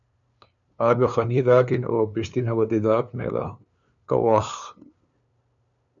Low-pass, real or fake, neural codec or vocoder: 7.2 kHz; fake; codec, 16 kHz, 2 kbps, FunCodec, trained on LibriTTS, 25 frames a second